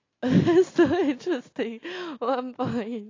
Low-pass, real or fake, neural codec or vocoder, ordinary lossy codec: 7.2 kHz; real; none; AAC, 32 kbps